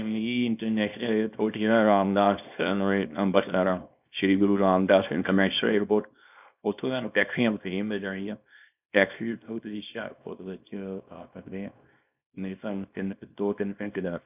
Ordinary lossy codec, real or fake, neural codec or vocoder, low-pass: none; fake; codec, 24 kHz, 0.9 kbps, WavTokenizer, small release; 3.6 kHz